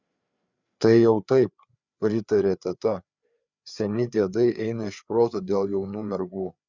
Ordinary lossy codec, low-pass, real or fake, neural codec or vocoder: Opus, 64 kbps; 7.2 kHz; fake; codec, 16 kHz, 4 kbps, FreqCodec, larger model